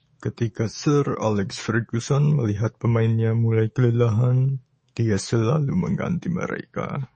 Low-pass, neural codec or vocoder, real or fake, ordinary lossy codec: 10.8 kHz; autoencoder, 48 kHz, 128 numbers a frame, DAC-VAE, trained on Japanese speech; fake; MP3, 32 kbps